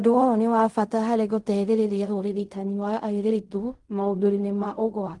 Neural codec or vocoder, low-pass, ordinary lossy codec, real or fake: codec, 16 kHz in and 24 kHz out, 0.4 kbps, LongCat-Audio-Codec, fine tuned four codebook decoder; 10.8 kHz; Opus, 24 kbps; fake